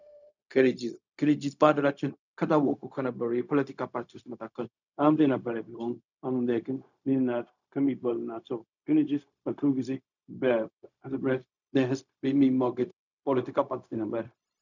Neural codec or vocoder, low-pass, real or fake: codec, 16 kHz, 0.4 kbps, LongCat-Audio-Codec; 7.2 kHz; fake